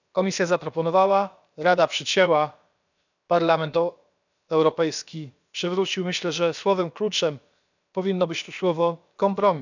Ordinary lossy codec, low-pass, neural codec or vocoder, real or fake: none; 7.2 kHz; codec, 16 kHz, about 1 kbps, DyCAST, with the encoder's durations; fake